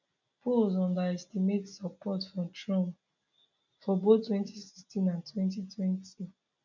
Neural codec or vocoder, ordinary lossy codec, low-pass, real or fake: none; none; 7.2 kHz; real